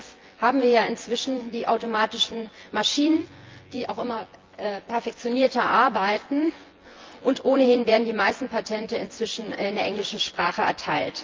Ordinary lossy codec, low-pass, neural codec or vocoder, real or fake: Opus, 32 kbps; 7.2 kHz; vocoder, 24 kHz, 100 mel bands, Vocos; fake